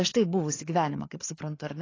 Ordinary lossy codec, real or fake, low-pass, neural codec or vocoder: AAC, 32 kbps; fake; 7.2 kHz; codec, 16 kHz, 4 kbps, FreqCodec, larger model